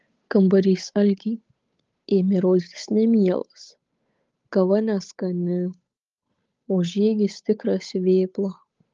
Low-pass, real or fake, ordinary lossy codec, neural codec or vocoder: 7.2 kHz; fake; Opus, 32 kbps; codec, 16 kHz, 8 kbps, FunCodec, trained on Chinese and English, 25 frames a second